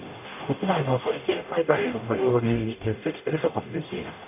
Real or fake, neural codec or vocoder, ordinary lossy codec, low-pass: fake; codec, 44.1 kHz, 0.9 kbps, DAC; none; 3.6 kHz